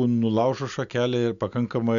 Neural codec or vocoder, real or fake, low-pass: none; real; 7.2 kHz